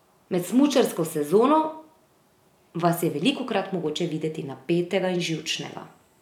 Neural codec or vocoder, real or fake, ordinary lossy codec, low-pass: none; real; none; 19.8 kHz